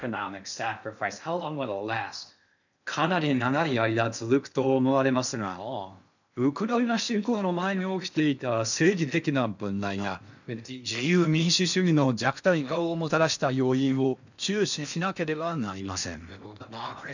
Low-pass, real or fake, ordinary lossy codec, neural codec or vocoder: 7.2 kHz; fake; none; codec, 16 kHz in and 24 kHz out, 0.6 kbps, FocalCodec, streaming, 4096 codes